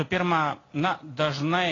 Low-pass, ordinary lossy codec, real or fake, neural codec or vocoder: 7.2 kHz; AAC, 32 kbps; real; none